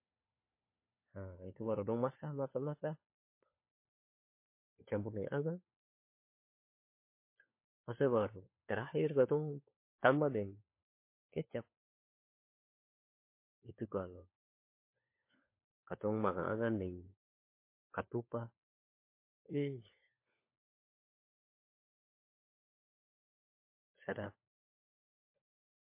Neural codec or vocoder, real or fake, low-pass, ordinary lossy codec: codec, 16 kHz, 4 kbps, FunCodec, trained on LibriTTS, 50 frames a second; fake; 3.6 kHz; MP3, 24 kbps